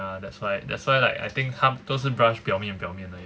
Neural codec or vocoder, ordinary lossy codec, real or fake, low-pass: none; none; real; none